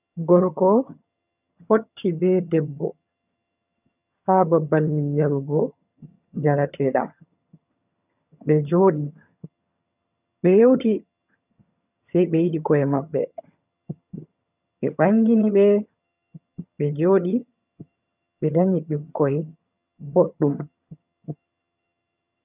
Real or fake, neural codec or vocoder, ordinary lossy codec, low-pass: fake; vocoder, 22.05 kHz, 80 mel bands, HiFi-GAN; none; 3.6 kHz